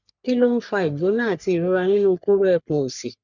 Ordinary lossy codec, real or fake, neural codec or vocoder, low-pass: none; fake; codec, 44.1 kHz, 3.4 kbps, Pupu-Codec; 7.2 kHz